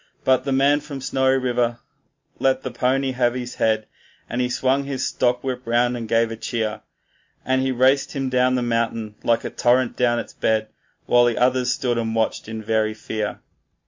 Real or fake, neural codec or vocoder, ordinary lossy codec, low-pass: real; none; MP3, 48 kbps; 7.2 kHz